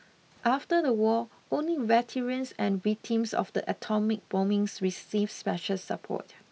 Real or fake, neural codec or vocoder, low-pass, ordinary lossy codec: real; none; none; none